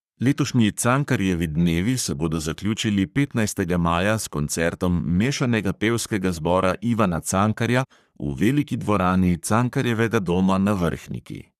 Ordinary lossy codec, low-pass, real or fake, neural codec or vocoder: none; 14.4 kHz; fake; codec, 44.1 kHz, 3.4 kbps, Pupu-Codec